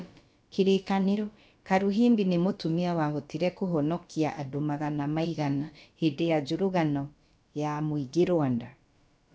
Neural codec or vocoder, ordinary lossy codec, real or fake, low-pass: codec, 16 kHz, about 1 kbps, DyCAST, with the encoder's durations; none; fake; none